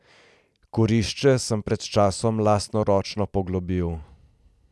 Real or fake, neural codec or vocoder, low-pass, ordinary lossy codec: real; none; none; none